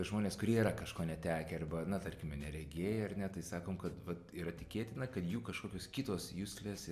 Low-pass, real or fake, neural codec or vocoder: 14.4 kHz; real; none